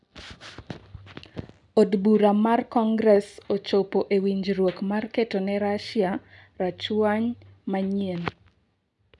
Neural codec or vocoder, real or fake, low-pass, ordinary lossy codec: none; real; 10.8 kHz; none